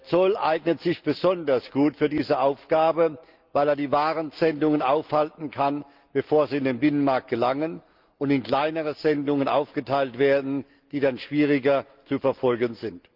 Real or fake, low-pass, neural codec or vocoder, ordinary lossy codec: real; 5.4 kHz; none; Opus, 32 kbps